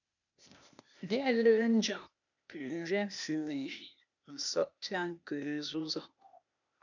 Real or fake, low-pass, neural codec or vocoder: fake; 7.2 kHz; codec, 16 kHz, 0.8 kbps, ZipCodec